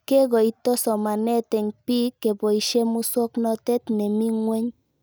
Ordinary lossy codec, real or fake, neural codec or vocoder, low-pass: none; real; none; none